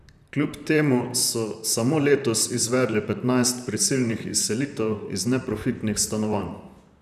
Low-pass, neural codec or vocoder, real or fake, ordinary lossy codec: 14.4 kHz; vocoder, 44.1 kHz, 128 mel bands, Pupu-Vocoder; fake; none